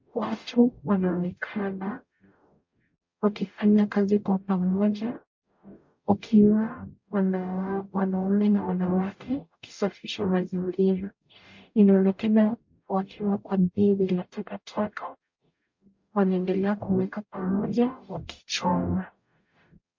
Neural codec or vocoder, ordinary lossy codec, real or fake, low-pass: codec, 44.1 kHz, 0.9 kbps, DAC; MP3, 48 kbps; fake; 7.2 kHz